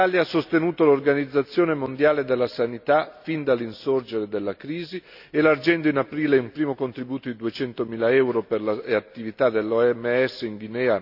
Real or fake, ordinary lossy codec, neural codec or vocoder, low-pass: real; none; none; 5.4 kHz